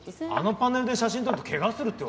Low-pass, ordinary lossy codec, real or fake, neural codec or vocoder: none; none; real; none